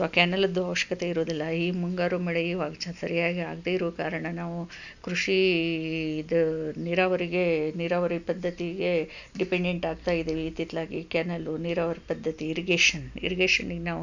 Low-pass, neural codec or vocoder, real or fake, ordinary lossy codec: 7.2 kHz; none; real; none